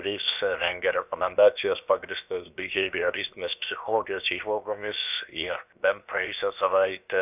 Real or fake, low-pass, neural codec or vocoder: fake; 3.6 kHz; codec, 16 kHz, 0.7 kbps, FocalCodec